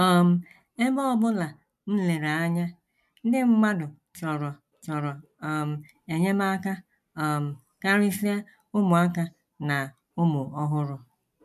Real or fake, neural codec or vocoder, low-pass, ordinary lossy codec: real; none; 14.4 kHz; MP3, 96 kbps